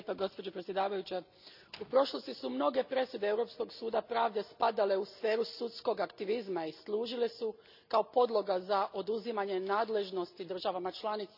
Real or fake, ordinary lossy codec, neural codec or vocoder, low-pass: real; none; none; 5.4 kHz